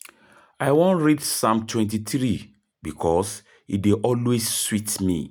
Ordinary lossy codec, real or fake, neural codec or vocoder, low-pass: none; real; none; none